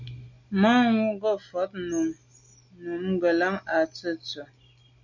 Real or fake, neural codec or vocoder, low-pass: real; none; 7.2 kHz